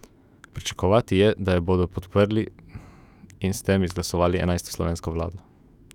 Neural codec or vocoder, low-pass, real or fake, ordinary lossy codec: autoencoder, 48 kHz, 128 numbers a frame, DAC-VAE, trained on Japanese speech; 19.8 kHz; fake; none